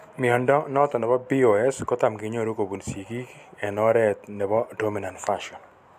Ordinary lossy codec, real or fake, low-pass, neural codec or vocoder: none; real; 14.4 kHz; none